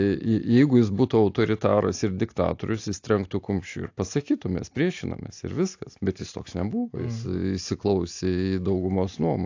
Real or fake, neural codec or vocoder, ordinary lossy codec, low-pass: real; none; AAC, 48 kbps; 7.2 kHz